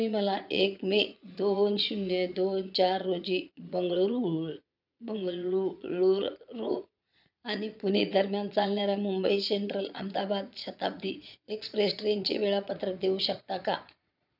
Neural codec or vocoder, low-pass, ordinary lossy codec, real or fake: vocoder, 22.05 kHz, 80 mel bands, Vocos; 5.4 kHz; none; fake